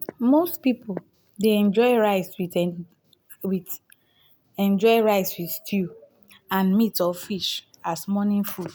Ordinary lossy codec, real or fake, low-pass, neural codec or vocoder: none; real; none; none